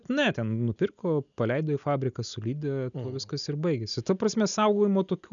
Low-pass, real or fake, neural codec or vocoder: 7.2 kHz; real; none